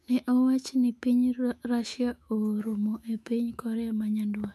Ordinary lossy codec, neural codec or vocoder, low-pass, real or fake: MP3, 96 kbps; autoencoder, 48 kHz, 128 numbers a frame, DAC-VAE, trained on Japanese speech; 14.4 kHz; fake